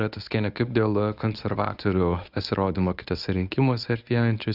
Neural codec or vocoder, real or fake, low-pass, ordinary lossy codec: codec, 24 kHz, 0.9 kbps, WavTokenizer, medium speech release version 1; fake; 5.4 kHz; Opus, 64 kbps